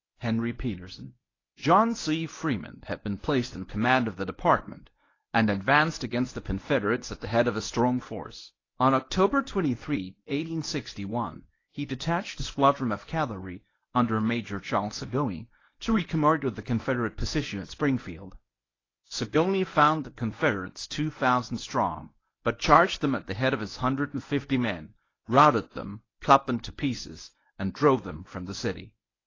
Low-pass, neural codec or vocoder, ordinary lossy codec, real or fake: 7.2 kHz; codec, 24 kHz, 0.9 kbps, WavTokenizer, medium speech release version 1; AAC, 32 kbps; fake